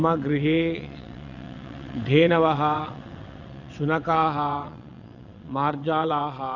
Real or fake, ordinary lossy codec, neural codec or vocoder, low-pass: fake; none; vocoder, 22.05 kHz, 80 mel bands, WaveNeXt; 7.2 kHz